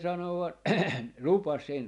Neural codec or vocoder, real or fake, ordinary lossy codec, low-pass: none; real; none; 10.8 kHz